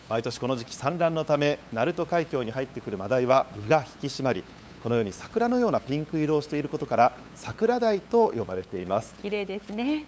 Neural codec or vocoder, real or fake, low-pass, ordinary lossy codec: codec, 16 kHz, 8 kbps, FunCodec, trained on LibriTTS, 25 frames a second; fake; none; none